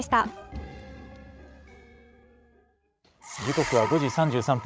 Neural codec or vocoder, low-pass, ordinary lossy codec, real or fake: codec, 16 kHz, 16 kbps, FreqCodec, larger model; none; none; fake